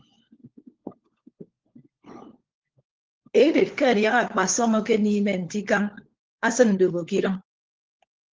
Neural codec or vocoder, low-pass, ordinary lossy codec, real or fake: codec, 16 kHz, 4 kbps, FunCodec, trained on LibriTTS, 50 frames a second; 7.2 kHz; Opus, 16 kbps; fake